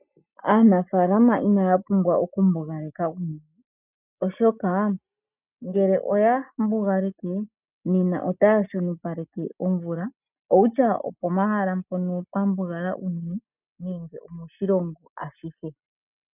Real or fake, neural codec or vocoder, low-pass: real; none; 3.6 kHz